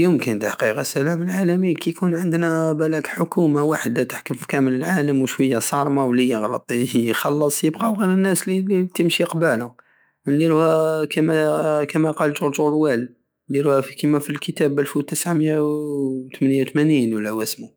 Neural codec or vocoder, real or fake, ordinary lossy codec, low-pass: autoencoder, 48 kHz, 128 numbers a frame, DAC-VAE, trained on Japanese speech; fake; none; none